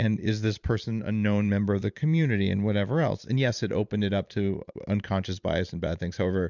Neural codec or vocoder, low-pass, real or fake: vocoder, 44.1 kHz, 128 mel bands every 512 samples, BigVGAN v2; 7.2 kHz; fake